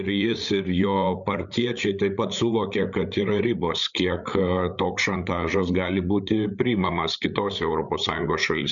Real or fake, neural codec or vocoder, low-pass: fake; codec, 16 kHz, 8 kbps, FreqCodec, larger model; 7.2 kHz